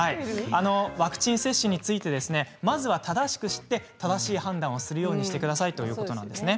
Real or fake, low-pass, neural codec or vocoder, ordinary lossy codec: real; none; none; none